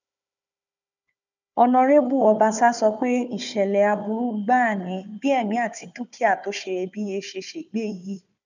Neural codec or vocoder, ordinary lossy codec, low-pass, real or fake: codec, 16 kHz, 4 kbps, FunCodec, trained on Chinese and English, 50 frames a second; none; 7.2 kHz; fake